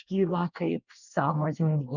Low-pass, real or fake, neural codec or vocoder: 7.2 kHz; fake; codec, 24 kHz, 1 kbps, SNAC